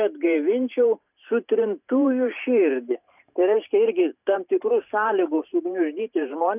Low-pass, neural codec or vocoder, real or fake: 3.6 kHz; vocoder, 44.1 kHz, 128 mel bands every 512 samples, BigVGAN v2; fake